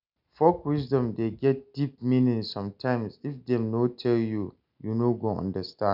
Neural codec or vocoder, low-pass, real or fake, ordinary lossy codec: none; 5.4 kHz; real; none